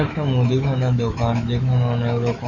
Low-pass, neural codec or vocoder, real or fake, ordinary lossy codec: 7.2 kHz; autoencoder, 48 kHz, 128 numbers a frame, DAC-VAE, trained on Japanese speech; fake; none